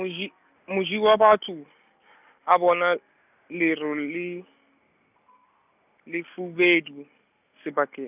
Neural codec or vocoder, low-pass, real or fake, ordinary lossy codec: none; 3.6 kHz; real; none